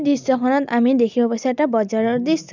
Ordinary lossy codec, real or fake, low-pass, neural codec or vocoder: none; real; 7.2 kHz; none